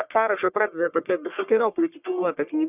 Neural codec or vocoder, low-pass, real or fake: codec, 44.1 kHz, 1.7 kbps, Pupu-Codec; 3.6 kHz; fake